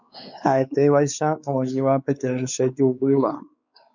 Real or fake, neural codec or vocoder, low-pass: fake; codec, 16 kHz, 4 kbps, X-Codec, WavLM features, trained on Multilingual LibriSpeech; 7.2 kHz